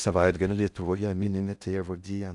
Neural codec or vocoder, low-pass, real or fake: codec, 16 kHz in and 24 kHz out, 0.6 kbps, FocalCodec, streaming, 4096 codes; 10.8 kHz; fake